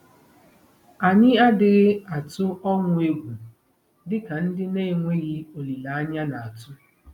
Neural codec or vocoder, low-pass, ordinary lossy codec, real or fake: none; 19.8 kHz; none; real